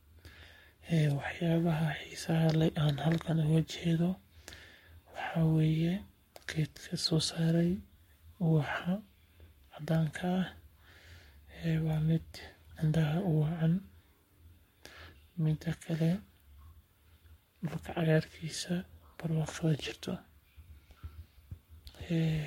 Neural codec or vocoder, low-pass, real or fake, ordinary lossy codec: codec, 44.1 kHz, 7.8 kbps, Pupu-Codec; 19.8 kHz; fake; MP3, 64 kbps